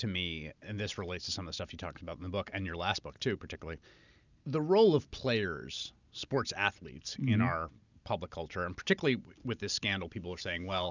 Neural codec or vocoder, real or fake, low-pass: none; real; 7.2 kHz